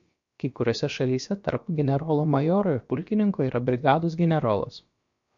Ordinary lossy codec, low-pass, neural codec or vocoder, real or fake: MP3, 48 kbps; 7.2 kHz; codec, 16 kHz, about 1 kbps, DyCAST, with the encoder's durations; fake